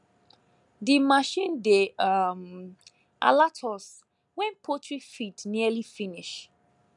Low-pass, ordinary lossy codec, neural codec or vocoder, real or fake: 10.8 kHz; none; none; real